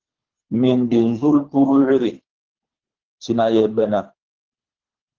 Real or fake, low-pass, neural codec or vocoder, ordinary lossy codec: fake; 7.2 kHz; codec, 24 kHz, 3 kbps, HILCodec; Opus, 16 kbps